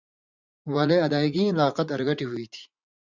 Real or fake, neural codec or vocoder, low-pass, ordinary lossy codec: fake; vocoder, 44.1 kHz, 80 mel bands, Vocos; 7.2 kHz; Opus, 64 kbps